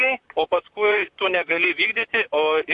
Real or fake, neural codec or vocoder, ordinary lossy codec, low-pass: fake; vocoder, 44.1 kHz, 128 mel bands, Pupu-Vocoder; AAC, 64 kbps; 10.8 kHz